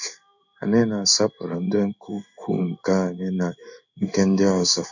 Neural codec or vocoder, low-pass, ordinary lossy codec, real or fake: codec, 16 kHz in and 24 kHz out, 1 kbps, XY-Tokenizer; 7.2 kHz; none; fake